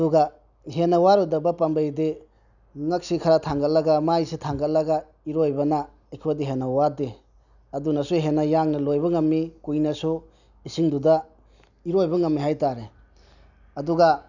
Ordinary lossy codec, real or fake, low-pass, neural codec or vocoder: none; real; 7.2 kHz; none